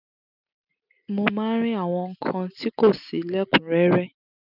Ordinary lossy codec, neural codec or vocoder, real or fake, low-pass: none; none; real; 5.4 kHz